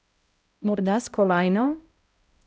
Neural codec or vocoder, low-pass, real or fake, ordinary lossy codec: codec, 16 kHz, 0.5 kbps, X-Codec, HuBERT features, trained on balanced general audio; none; fake; none